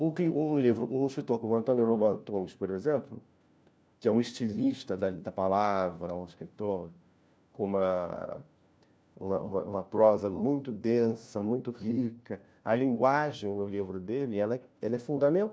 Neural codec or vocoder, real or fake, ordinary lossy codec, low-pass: codec, 16 kHz, 1 kbps, FunCodec, trained on LibriTTS, 50 frames a second; fake; none; none